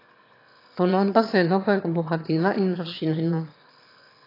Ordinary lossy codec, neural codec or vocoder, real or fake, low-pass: AAC, 32 kbps; autoencoder, 22.05 kHz, a latent of 192 numbers a frame, VITS, trained on one speaker; fake; 5.4 kHz